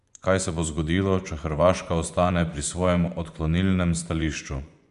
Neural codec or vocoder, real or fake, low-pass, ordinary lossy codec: vocoder, 24 kHz, 100 mel bands, Vocos; fake; 10.8 kHz; none